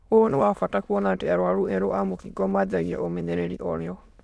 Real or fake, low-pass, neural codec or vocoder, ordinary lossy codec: fake; none; autoencoder, 22.05 kHz, a latent of 192 numbers a frame, VITS, trained on many speakers; none